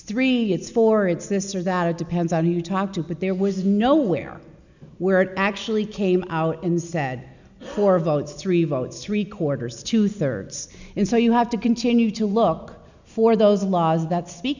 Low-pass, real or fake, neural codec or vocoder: 7.2 kHz; real; none